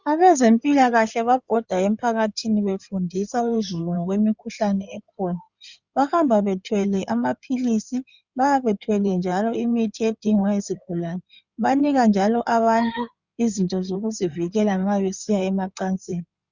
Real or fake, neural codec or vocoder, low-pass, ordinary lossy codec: fake; codec, 16 kHz in and 24 kHz out, 2.2 kbps, FireRedTTS-2 codec; 7.2 kHz; Opus, 64 kbps